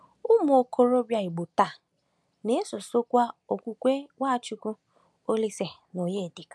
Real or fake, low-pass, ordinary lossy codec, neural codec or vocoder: real; none; none; none